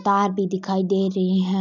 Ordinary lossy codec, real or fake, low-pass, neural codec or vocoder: none; real; 7.2 kHz; none